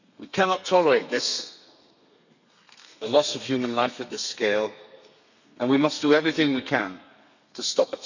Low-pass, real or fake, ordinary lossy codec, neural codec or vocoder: 7.2 kHz; fake; none; codec, 32 kHz, 1.9 kbps, SNAC